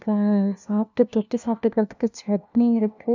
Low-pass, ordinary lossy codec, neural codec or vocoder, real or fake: 7.2 kHz; none; codec, 16 kHz, 1 kbps, FunCodec, trained on LibriTTS, 50 frames a second; fake